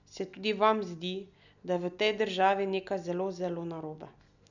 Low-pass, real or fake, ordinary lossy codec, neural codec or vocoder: 7.2 kHz; real; none; none